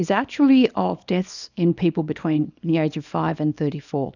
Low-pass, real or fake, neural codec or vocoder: 7.2 kHz; fake; codec, 24 kHz, 0.9 kbps, WavTokenizer, small release